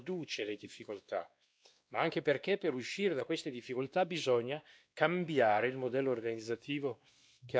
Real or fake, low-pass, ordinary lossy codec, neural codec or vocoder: fake; none; none; codec, 16 kHz, 2 kbps, X-Codec, WavLM features, trained on Multilingual LibriSpeech